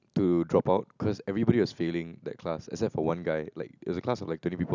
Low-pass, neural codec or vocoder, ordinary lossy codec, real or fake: 7.2 kHz; none; none; real